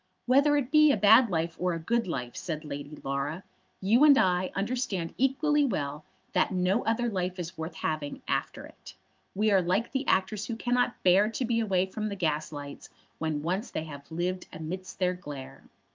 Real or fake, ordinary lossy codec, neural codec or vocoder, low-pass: fake; Opus, 32 kbps; autoencoder, 48 kHz, 128 numbers a frame, DAC-VAE, trained on Japanese speech; 7.2 kHz